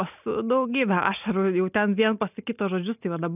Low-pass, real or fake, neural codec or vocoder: 3.6 kHz; real; none